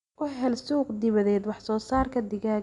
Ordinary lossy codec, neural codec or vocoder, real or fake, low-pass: none; none; real; 10.8 kHz